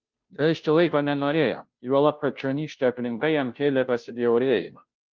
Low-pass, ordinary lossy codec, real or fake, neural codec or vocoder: 7.2 kHz; Opus, 32 kbps; fake; codec, 16 kHz, 0.5 kbps, FunCodec, trained on Chinese and English, 25 frames a second